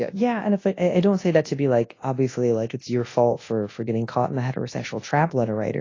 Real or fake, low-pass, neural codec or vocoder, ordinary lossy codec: fake; 7.2 kHz; codec, 24 kHz, 0.9 kbps, WavTokenizer, large speech release; AAC, 32 kbps